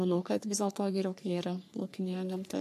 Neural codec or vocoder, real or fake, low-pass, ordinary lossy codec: codec, 44.1 kHz, 3.4 kbps, Pupu-Codec; fake; 14.4 kHz; MP3, 64 kbps